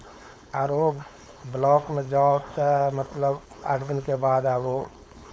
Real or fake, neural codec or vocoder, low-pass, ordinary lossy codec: fake; codec, 16 kHz, 4.8 kbps, FACodec; none; none